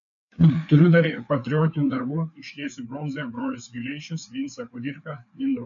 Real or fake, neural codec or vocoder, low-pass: fake; codec, 16 kHz, 4 kbps, FreqCodec, larger model; 7.2 kHz